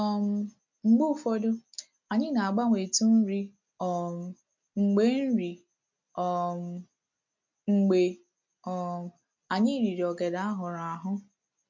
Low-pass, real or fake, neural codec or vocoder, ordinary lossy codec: 7.2 kHz; real; none; MP3, 64 kbps